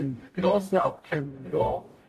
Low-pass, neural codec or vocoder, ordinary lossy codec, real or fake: 14.4 kHz; codec, 44.1 kHz, 0.9 kbps, DAC; AAC, 64 kbps; fake